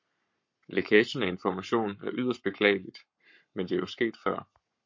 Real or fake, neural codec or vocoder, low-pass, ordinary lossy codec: fake; codec, 44.1 kHz, 7.8 kbps, Pupu-Codec; 7.2 kHz; MP3, 48 kbps